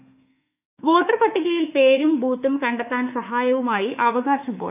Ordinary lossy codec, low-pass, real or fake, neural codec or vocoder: none; 3.6 kHz; fake; autoencoder, 48 kHz, 32 numbers a frame, DAC-VAE, trained on Japanese speech